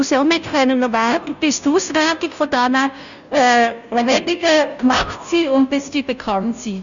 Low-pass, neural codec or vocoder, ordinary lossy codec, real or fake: 7.2 kHz; codec, 16 kHz, 0.5 kbps, FunCodec, trained on Chinese and English, 25 frames a second; none; fake